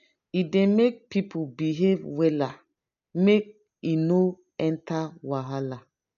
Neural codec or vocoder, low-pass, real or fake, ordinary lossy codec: none; 7.2 kHz; real; none